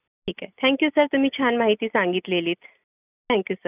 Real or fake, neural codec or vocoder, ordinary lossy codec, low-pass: real; none; none; 3.6 kHz